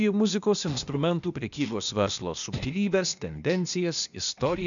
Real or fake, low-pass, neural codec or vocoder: fake; 7.2 kHz; codec, 16 kHz, 0.8 kbps, ZipCodec